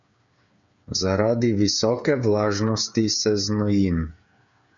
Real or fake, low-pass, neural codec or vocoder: fake; 7.2 kHz; codec, 16 kHz, 8 kbps, FreqCodec, smaller model